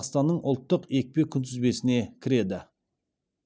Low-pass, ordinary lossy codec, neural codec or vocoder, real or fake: none; none; none; real